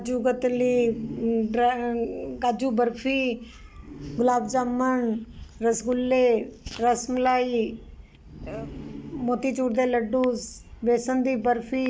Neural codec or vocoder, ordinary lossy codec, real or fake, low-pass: none; none; real; none